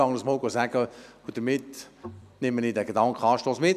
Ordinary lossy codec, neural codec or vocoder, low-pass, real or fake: none; vocoder, 44.1 kHz, 128 mel bands every 256 samples, BigVGAN v2; 14.4 kHz; fake